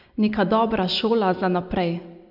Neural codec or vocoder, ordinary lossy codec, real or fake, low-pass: none; none; real; 5.4 kHz